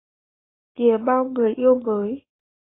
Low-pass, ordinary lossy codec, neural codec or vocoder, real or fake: 7.2 kHz; AAC, 16 kbps; codec, 44.1 kHz, 7.8 kbps, Pupu-Codec; fake